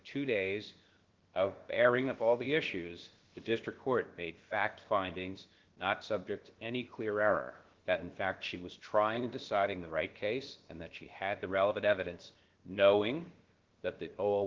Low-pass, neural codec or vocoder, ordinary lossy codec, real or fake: 7.2 kHz; codec, 16 kHz, about 1 kbps, DyCAST, with the encoder's durations; Opus, 16 kbps; fake